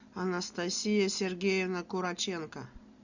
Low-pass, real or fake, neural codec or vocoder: 7.2 kHz; real; none